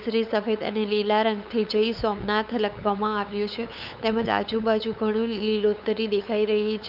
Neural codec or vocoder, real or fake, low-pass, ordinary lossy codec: codec, 16 kHz, 8 kbps, FunCodec, trained on LibriTTS, 25 frames a second; fake; 5.4 kHz; none